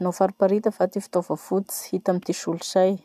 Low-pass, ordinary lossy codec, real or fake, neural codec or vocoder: 14.4 kHz; none; real; none